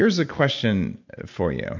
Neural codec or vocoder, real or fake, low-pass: none; real; 7.2 kHz